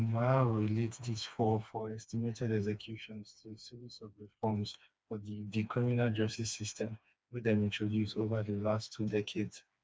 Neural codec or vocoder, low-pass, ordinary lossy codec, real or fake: codec, 16 kHz, 2 kbps, FreqCodec, smaller model; none; none; fake